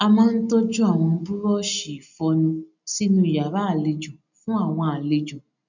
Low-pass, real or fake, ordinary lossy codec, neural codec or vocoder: 7.2 kHz; real; none; none